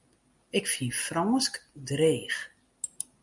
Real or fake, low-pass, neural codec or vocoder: real; 10.8 kHz; none